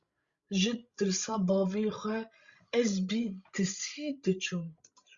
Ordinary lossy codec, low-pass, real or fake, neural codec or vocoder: Opus, 64 kbps; 7.2 kHz; fake; codec, 16 kHz, 16 kbps, FreqCodec, larger model